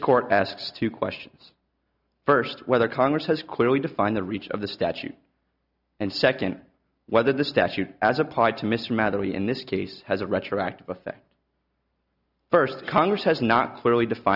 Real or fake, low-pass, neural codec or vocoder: real; 5.4 kHz; none